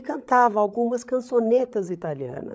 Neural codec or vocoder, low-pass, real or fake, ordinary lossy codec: codec, 16 kHz, 16 kbps, FreqCodec, larger model; none; fake; none